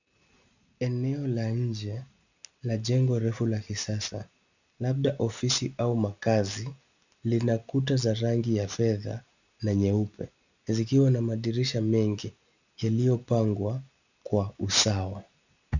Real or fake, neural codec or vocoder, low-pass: real; none; 7.2 kHz